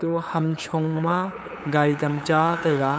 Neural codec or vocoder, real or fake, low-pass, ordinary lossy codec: codec, 16 kHz, 8 kbps, FunCodec, trained on LibriTTS, 25 frames a second; fake; none; none